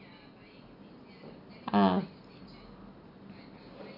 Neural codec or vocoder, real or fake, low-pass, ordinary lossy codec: none; real; 5.4 kHz; none